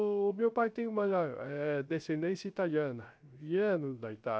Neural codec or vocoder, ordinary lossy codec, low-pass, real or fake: codec, 16 kHz, 0.3 kbps, FocalCodec; none; none; fake